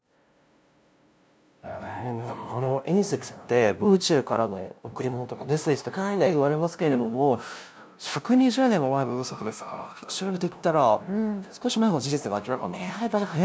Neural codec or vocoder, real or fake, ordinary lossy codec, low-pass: codec, 16 kHz, 0.5 kbps, FunCodec, trained on LibriTTS, 25 frames a second; fake; none; none